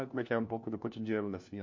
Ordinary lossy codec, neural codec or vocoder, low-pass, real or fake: MP3, 48 kbps; codec, 16 kHz, 1 kbps, FunCodec, trained on LibriTTS, 50 frames a second; 7.2 kHz; fake